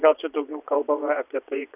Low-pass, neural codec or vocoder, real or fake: 3.6 kHz; vocoder, 22.05 kHz, 80 mel bands, Vocos; fake